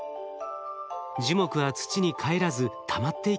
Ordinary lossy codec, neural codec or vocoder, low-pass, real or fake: none; none; none; real